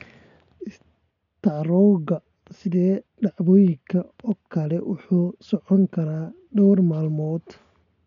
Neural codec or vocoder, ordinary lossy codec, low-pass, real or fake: none; none; 7.2 kHz; real